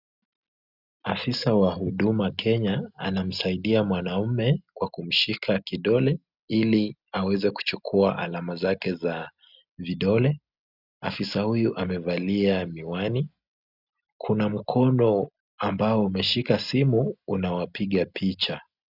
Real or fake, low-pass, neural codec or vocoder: real; 5.4 kHz; none